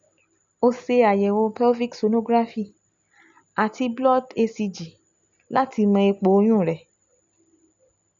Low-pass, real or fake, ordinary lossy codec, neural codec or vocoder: 7.2 kHz; real; none; none